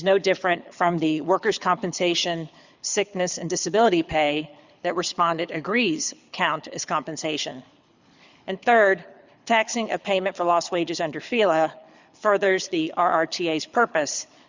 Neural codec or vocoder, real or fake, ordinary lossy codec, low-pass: codec, 24 kHz, 6 kbps, HILCodec; fake; Opus, 64 kbps; 7.2 kHz